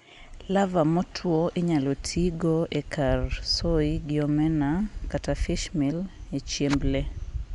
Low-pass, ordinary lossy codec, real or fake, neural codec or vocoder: 10.8 kHz; none; real; none